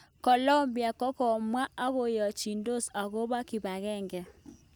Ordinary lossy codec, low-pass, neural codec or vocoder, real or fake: none; none; none; real